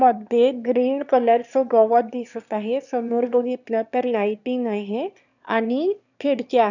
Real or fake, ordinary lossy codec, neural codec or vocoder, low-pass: fake; none; autoencoder, 22.05 kHz, a latent of 192 numbers a frame, VITS, trained on one speaker; 7.2 kHz